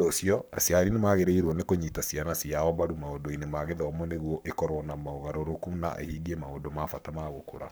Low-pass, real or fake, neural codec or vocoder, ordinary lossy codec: none; fake; codec, 44.1 kHz, 7.8 kbps, Pupu-Codec; none